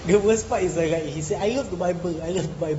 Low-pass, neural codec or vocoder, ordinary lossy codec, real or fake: 10.8 kHz; none; AAC, 24 kbps; real